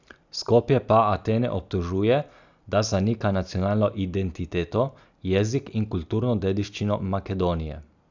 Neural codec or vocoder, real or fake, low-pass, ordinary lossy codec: none; real; 7.2 kHz; none